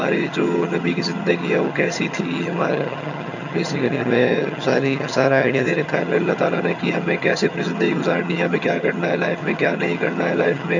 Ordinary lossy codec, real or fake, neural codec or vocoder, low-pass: none; fake; vocoder, 22.05 kHz, 80 mel bands, HiFi-GAN; 7.2 kHz